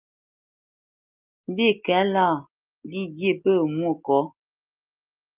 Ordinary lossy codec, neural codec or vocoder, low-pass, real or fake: Opus, 24 kbps; none; 3.6 kHz; real